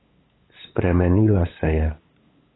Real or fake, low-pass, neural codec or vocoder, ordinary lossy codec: fake; 7.2 kHz; codec, 16 kHz, 8 kbps, FunCodec, trained on LibriTTS, 25 frames a second; AAC, 16 kbps